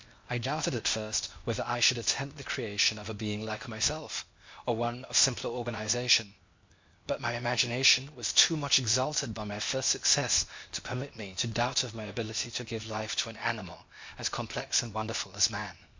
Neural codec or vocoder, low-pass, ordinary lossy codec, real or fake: codec, 16 kHz, 0.8 kbps, ZipCodec; 7.2 kHz; MP3, 64 kbps; fake